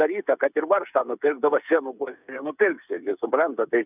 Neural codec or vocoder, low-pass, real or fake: codec, 16 kHz, 8 kbps, FreqCodec, smaller model; 3.6 kHz; fake